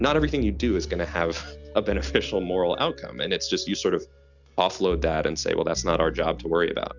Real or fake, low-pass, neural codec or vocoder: real; 7.2 kHz; none